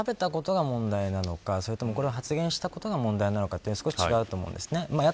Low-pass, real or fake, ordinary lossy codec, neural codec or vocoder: none; real; none; none